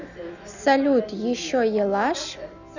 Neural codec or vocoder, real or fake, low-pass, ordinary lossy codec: none; real; 7.2 kHz; none